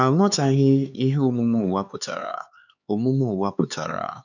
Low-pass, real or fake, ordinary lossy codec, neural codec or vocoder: 7.2 kHz; fake; none; codec, 16 kHz, 4 kbps, X-Codec, HuBERT features, trained on LibriSpeech